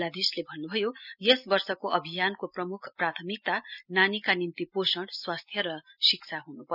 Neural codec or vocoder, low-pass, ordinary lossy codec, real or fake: none; 5.4 kHz; none; real